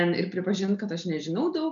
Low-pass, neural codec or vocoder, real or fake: 7.2 kHz; none; real